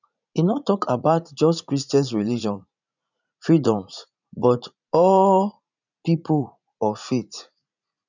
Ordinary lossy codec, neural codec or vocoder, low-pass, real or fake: none; vocoder, 44.1 kHz, 80 mel bands, Vocos; 7.2 kHz; fake